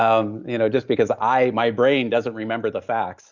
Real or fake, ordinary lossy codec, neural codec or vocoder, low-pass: real; Opus, 64 kbps; none; 7.2 kHz